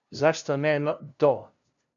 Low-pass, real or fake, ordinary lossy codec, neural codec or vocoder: 7.2 kHz; fake; MP3, 96 kbps; codec, 16 kHz, 0.5 kbps, FunCodec, trained on LibriTTS, 25 frames a second